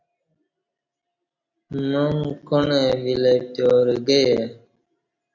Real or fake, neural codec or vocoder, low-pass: real; none; 7.2 kHz